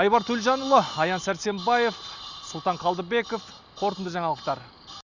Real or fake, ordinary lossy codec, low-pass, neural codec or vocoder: real; Opus, 64 kbps; 7.2 kHz; none